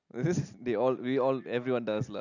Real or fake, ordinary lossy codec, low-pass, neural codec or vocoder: real; none; 7.2 kHz; none